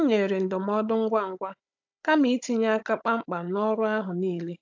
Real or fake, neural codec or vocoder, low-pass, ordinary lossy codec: fake; codec, 16 kHz, 16 kbps, FunCodec, trained on Chinese and English, 50 frames a second; 7.2 kHz; AAC, 48 kbps